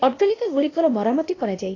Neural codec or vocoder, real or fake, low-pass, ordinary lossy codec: codec, 16 kHz, 0.8 kbps, ZipCodec; fake; 7.2 kHz; AAC, 32 kbps